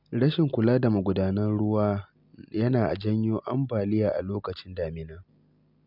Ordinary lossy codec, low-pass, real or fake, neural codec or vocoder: none; 5.4 kHz; real; none